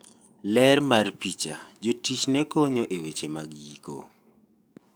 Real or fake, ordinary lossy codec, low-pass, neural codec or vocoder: fake; none; none; codec, 44.1 kHz, 7.8 kbps, DAC